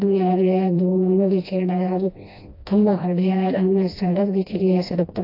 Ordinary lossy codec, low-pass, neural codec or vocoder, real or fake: AAC, 32 kbps; 5.4 kHz; codec, 16 kHz, 1 kbps, FreqCodec, smaller model; fake